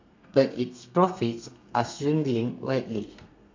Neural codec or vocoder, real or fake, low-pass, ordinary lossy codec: codec, 32 kHz, 1.9 kbps, SNAC; fake; 7.2 kHz; MP3, 64 kbps